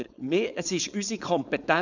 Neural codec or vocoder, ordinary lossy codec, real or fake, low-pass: codec, 16 kHz, 4.8 kbps, FACodec; none; fake; 7.2 kHz